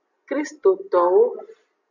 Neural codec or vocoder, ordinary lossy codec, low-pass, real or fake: none; AAC, 48 kbps; 7.2 kHz; real